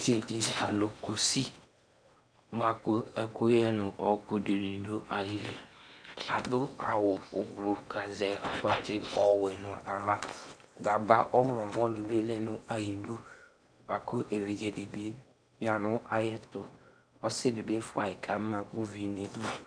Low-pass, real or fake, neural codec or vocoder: 9.9 kHz; fake; codec, 16 kHz in and 24 kHz out, 0.8 kbps, FocalCodec, streaming, 65536 codes